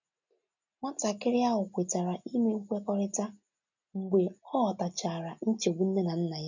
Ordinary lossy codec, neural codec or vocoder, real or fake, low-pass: none; none; real; 7.2 kHz